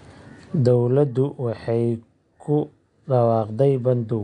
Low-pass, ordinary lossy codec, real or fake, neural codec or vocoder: 9.9 kHz; MP3, 64 kbps; real; none